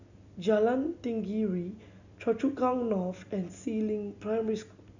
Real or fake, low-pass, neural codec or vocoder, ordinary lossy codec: real; 7.2 kHz; none; none